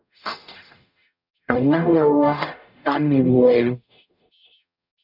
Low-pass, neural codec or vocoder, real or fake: 5.4 kHz; codec, 44.1 kHz, 0.9 kbps, DAC; fake